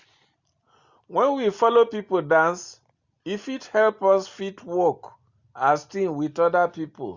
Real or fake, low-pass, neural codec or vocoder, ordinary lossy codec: real; 7.2 kHz; none; Opus, 64 kbps